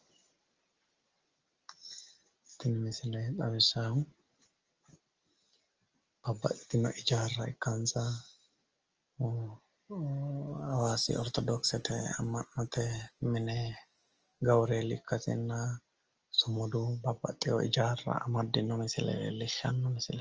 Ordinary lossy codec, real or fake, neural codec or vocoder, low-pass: Opus, 24 kbps; real; none; 7.2 kHz